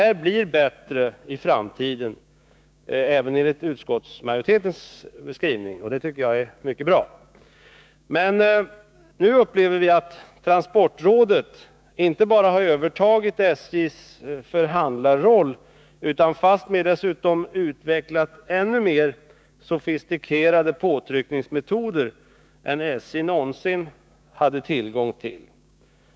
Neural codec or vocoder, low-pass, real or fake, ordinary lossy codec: codec, 16 kHz, 6 kbps, DAC; none; fake; none